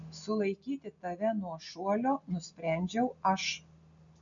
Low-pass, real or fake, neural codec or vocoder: 7.2 kHz; real; none